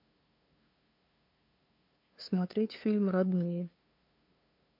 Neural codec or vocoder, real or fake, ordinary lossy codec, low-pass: codec, 16 kHz, 2 kbps, FunCodec, trained on LibriTTS, 25 frames a second; fake; MP3, 32 kbps; 5.4 kHz